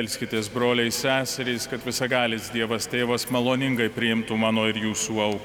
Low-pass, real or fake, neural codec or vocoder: 19.8 kHz; real; none